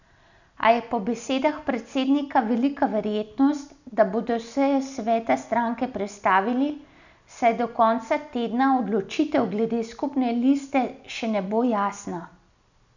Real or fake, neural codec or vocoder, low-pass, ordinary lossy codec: real; none; 7.2 kHz; none